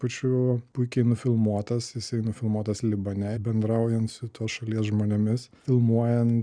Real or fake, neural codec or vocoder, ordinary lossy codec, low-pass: real; none; Opus, 64 kbps; 9.9 kHz